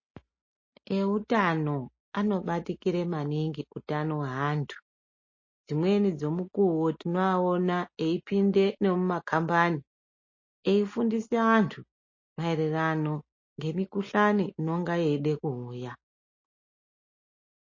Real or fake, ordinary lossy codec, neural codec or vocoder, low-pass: real; MP3, 32 kbps; none; 7.2 kHz